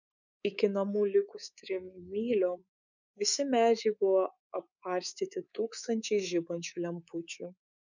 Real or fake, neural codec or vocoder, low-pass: fake; codec, 24 kHz, 3.1 kbps, DualCodec; 7.2 kHz